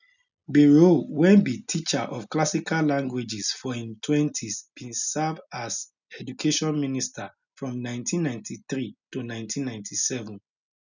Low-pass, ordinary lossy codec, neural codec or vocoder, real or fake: 7.2 kHz; none; none; real